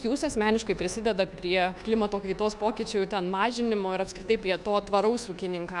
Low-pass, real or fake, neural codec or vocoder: 10.8 kHz; fake; codec, 24 kHz, 1.2 kbps, DualCodec